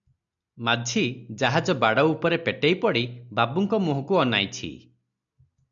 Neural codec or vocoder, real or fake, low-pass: none; real; 7.2 kHz